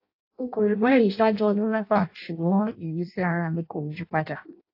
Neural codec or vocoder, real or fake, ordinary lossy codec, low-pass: codec, 16 kHz in and 24 kHz out, 0.6 kbps, FireRedTTS-2 codec; fake; AAC, 32 kbps; 5.4 kHz